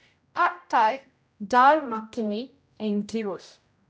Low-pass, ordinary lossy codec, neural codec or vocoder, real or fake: none; none; codec, 16 kHz, 0.5 kbps, X-Codec, HuBERT features, trained on general audio; fake